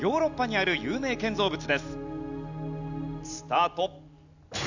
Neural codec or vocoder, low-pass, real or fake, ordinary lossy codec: none; 7.2 kHz; real; none